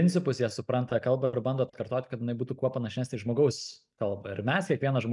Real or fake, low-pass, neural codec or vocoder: real; 10.8 kHz; none